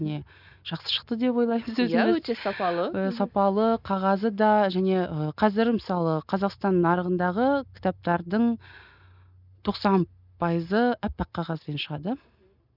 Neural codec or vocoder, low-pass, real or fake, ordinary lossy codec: none; 5.4 kHz; real; none